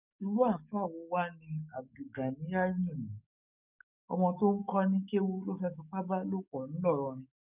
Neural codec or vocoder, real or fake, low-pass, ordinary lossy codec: codec, 44.1 kHz, 7.8 kbps, DAC; fake; 3.6 kHz; none